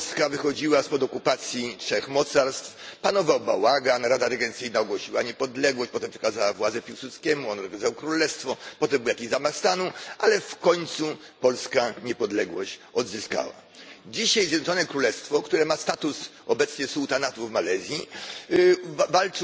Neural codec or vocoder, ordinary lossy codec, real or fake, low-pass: none; none; real; none